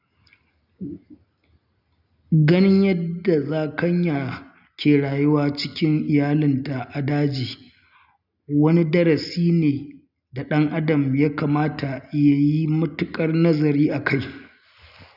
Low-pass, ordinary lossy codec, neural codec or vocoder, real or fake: 5.4 kHz; none; none; real